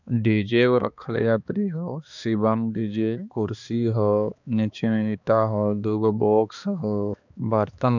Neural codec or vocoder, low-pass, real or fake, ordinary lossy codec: codec, 16 kHz, 2 kbps, X-Codec, HuBERT features, trained on balanced general audio; 7.2 kHz; fake; none